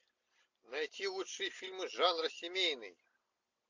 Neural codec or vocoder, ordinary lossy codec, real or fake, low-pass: none; AAC, 48 kbps; real; 7.2 kHz